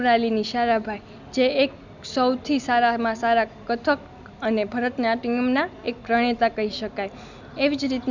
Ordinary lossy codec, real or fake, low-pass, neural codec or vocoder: none; real; 7.2 kHz; none